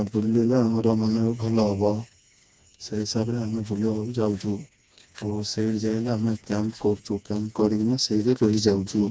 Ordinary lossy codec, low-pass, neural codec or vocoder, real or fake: none; none; codec, 16 kHz, 2 kbps, FreqCodec, smaller model; fake